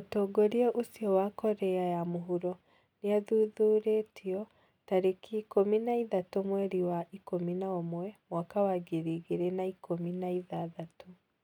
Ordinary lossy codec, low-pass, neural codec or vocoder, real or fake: none; 19.8 kHz; none; real